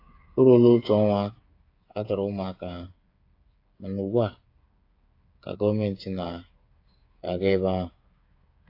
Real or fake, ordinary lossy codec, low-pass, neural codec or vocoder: fake; AAC, 32 kbps; 5.4 kHz; codec, 16 kHz, 8 kbps, FreqCodec, smaller model